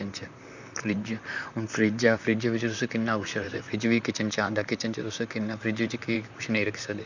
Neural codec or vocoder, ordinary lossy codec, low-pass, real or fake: vocoder, 44.1 kHz, 128 mel bands, Pupu-Vocoder; none; 7.2 kHz; fake